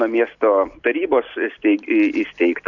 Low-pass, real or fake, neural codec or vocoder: 7.2 kHz; real; none